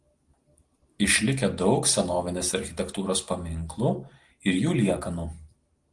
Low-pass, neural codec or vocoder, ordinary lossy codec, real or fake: 10.8 kHz; none; Opus, 24 kbps; real